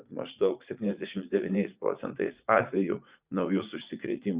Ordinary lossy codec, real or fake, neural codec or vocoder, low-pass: Opus, 64 kbps; fake; vocoder, 44.1 kHz, 80 mel bands, Vocos; 3.6 kHz